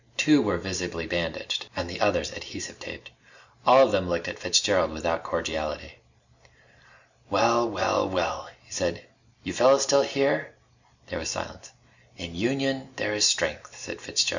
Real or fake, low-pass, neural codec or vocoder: real; 7.2 kHz; none